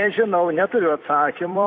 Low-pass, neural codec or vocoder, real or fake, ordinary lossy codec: 7.2 kHz; vocoder, 44.1 kHz, 128 mel bands every 256 samples, BigVGAN v2; fake; AAC, 32 kbps